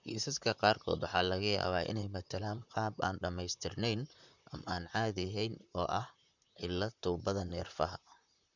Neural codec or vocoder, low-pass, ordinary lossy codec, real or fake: vocoder, 44.1 kHz, 128 mel bands, Pupu-Vocoder; 7.2 kHz; none; fake